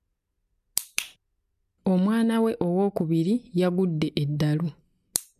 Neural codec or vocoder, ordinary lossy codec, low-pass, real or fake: none; MP3, 96 kbps; 14.4 kHz; real